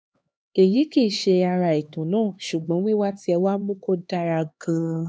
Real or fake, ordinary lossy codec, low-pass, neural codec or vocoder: fake; none; none; codec, 16 kHz, 2 kbps, X-Codec, HuBERT features, trained on LibriSpeech